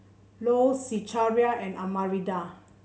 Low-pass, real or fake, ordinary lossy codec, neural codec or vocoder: none; real; none; none